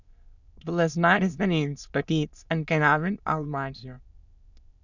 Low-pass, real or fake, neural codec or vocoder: 7.2 kHz; fake; autoencoder, 22.05 kHz, a latent of 192 numbers a frame, VITS, trained on many speakers